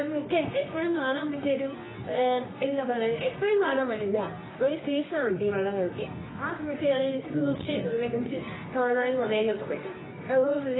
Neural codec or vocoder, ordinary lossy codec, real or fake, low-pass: codec, 24 kHz, 0.9 kbps, WavTokenizer, medium music audio release; AAC, 16 kbps; fake; 7.2 kHz